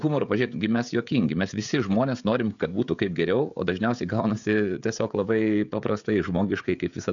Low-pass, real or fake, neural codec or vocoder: 7.2 kHz; fake; codec, 16 kHz, 16 kbps, FreqCodec, smaller model